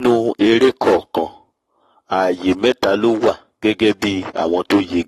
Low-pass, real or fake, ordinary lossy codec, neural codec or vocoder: 19.8 kHz; fake; AAC, 32 kbps; codec, 44.1 kHz, 7.8 kbps, Pupu-Codec